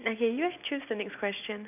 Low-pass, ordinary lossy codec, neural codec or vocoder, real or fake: 3.6 kHz; none; none; real